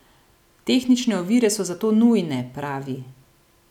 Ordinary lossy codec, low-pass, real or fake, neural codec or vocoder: none; 19.8 kHz; real; none